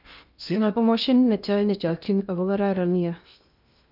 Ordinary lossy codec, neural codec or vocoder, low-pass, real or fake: none; codec, 16 kHz in and 24 kHz out, 0.6 kbps, FocalCodec, streaming, 2048 codes; 5.4 kHz; fake